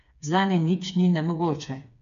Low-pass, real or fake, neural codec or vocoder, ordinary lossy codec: 7.2 kHz; fake; codec, 16 kHz, 4 kbps, FreqCodec, smaller model; none